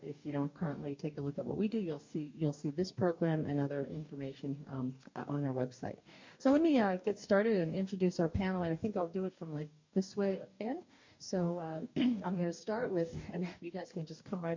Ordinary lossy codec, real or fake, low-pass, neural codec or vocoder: MP3, 48 kbps; fake; 7.2 kHz; codec, 44.1 kHz, 2.6 kbps, DAC